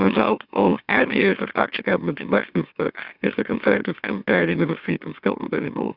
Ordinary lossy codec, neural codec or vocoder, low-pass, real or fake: Opus, 64 kbps; autoencoder, 44.1 kHz, a latent of 192 numbers a frame, MeloTTS; 5.4 kHz; fake